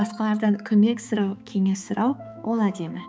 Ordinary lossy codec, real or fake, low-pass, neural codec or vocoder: none; fake; none; codec, 16 kHz, 4 kbps, X-Codec, HuBERT features, trained on balanced general audio